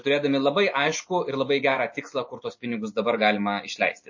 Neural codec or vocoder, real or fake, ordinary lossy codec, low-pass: none; real; MP3, 32 kbps; 7.2 kHz